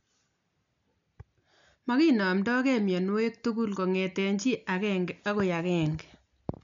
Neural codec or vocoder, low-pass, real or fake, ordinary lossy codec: none; 7.2 kHz; real; MP3, 64 kbps